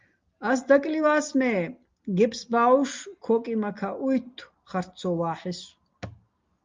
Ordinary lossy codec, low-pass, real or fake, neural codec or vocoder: Opus, 24 kbps; 7.2 kHz; real; none